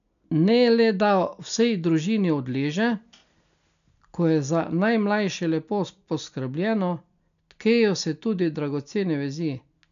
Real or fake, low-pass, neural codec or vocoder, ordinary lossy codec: real; 7.2 kHz; none; none